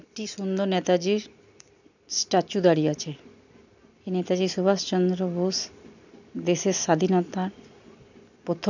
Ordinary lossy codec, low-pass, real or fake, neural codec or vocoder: none; 7.2 kHz; real; none